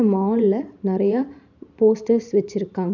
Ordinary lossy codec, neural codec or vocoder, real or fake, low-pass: none; vocoder, 44.1 kHz, 128 mel bands every 512 samples, BigVGAN v2; fake; 7.2 kHz